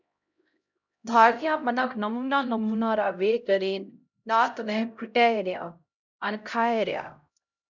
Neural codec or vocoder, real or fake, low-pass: codec, 16 kHz, 0.5 kbps, X-Codec, HuBERT features, trained on LibriSpeech; fake; 7.2 kHz